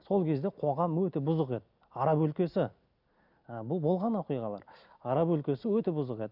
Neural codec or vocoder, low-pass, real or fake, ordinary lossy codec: none; 5.4 kHz; real; none